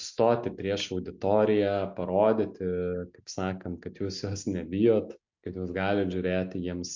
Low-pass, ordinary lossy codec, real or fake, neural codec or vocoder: 7.2 kHz; MP3, 64 kbps; real; none